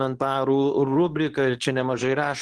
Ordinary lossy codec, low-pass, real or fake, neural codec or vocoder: Opus, 16 kbps; 10.8 kHz; fake; codec, 24 kHz, 0.9 kbps, WavTokenizer, medium speech release version 2